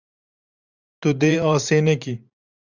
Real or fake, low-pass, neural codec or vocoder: fake; 7.2 kHz; vocoder, 44.1 kHz, 128 mel bands every 512 samples, BigVGAN v2